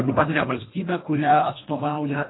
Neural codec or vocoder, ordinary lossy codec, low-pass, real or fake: codec, 24 kHz, 1.5 kbps, HILCodec; AAC, 16 kbps; 7.2 kHz; fake